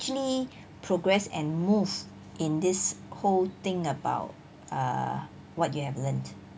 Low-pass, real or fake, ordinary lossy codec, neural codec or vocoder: none; real; none; none